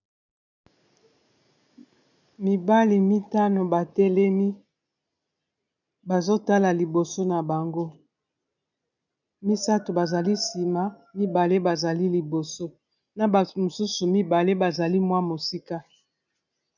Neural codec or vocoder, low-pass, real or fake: none; 7.2 kHz; real